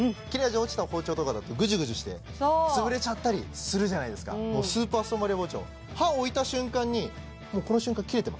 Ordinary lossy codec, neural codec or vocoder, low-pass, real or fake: none; none; none; real